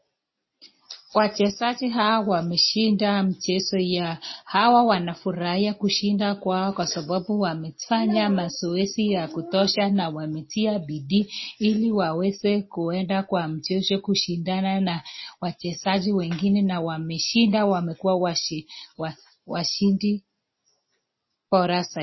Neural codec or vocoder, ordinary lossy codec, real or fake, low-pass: none; MP3, 24 kbps; real; 7.2 kHz